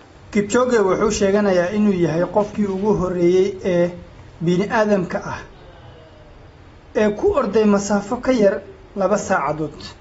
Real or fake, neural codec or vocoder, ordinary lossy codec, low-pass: real; none; AAC, 24 kbps; 19.8 kHz